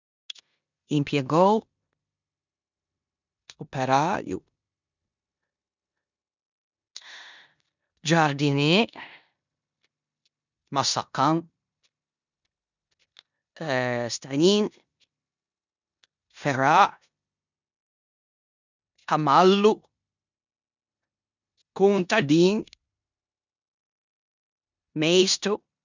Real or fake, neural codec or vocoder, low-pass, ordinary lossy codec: fake; codec, 16 kHz in and 24 kHz out, 0.9 kbps, LongCat-Audio-Codec, four codebook decoder; 7.2 kHz; none